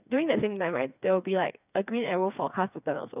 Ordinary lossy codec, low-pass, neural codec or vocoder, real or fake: none; 3.6 kHz; codec, 16 kHz, 4 kbps, FreqCodec, smaller model; fake